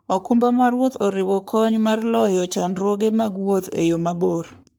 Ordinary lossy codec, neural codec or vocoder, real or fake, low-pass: none; codec, 44.1 kHz, 3.4 kbps, Pupu-Codec; fake; none